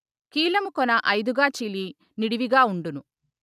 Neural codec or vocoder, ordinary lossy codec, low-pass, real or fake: none; none; 14.4 kHz; real